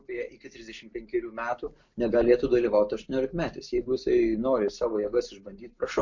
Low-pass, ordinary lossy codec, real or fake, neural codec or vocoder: 7.2 kHz; MP3, 48 kbps; real; none